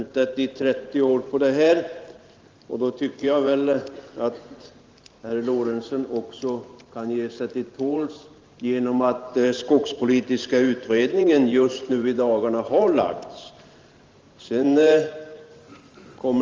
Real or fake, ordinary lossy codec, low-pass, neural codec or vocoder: fake; Opus, 32 kbps; 7.2 kHz; vocoder, 44.1 kHz, 128 mel bands every 512 samples, BigVGAN v2